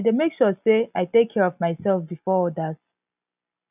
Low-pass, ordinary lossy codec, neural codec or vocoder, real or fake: 3.6 kHz; none; none; real